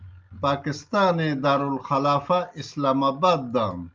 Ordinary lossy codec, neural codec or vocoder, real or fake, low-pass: Opus, 24 kbps; none; real; 7.2 kHz